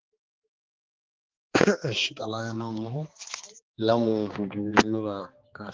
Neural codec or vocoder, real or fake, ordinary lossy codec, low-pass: codec, 16 kHz, 2 kbps, X-Codec, HuBERT features, trained on balanced general audio; fake; Opus, 16 kbps; 7.2 kHz